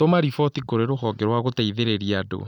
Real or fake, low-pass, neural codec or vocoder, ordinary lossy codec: real; 19.8 kHz; none; none